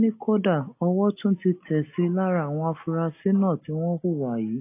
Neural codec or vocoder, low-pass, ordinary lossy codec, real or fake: none; 3.6 kHz; AAC, 24 kbps; real